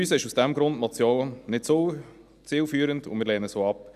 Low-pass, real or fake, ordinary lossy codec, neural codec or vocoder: 14.4 kHz; real; none; none